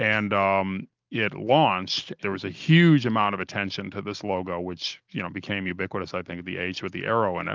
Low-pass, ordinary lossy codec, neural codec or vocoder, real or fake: 7.2 kHz; Opus, 24 kbps; none; real